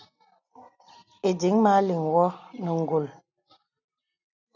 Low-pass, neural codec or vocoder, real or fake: 7.2 kHz; none; real